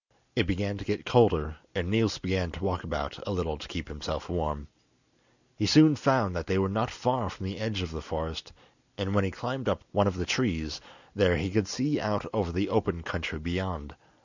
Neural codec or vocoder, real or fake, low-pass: none; real; 7.2 kHz